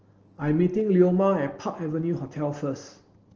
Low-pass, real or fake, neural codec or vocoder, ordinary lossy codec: 7.2 kHz; real; none; Opus, 16 kbps